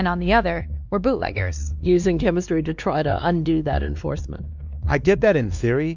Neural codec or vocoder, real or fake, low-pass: codec, 16 kHz, 2 kbps, X-Codec, WavLM features, trained on Multilingual LibriSpeech; fake; 7.2 kHz